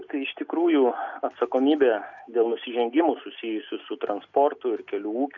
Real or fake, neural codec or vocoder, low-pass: real; none; 7.2 kHz